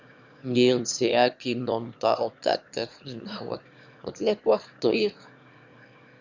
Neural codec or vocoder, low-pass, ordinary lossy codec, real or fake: autoencoder, 22.05 kHz, a latent of 192 numbers a frame, VITS, trained on one speaker; 7.2 kHz; Opus, 64 kbps; fake